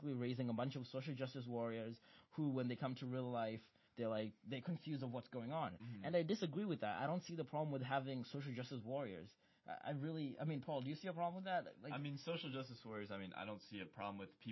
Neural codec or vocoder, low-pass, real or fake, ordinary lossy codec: none; 7.2 kHz; real; MP3, 24 kbps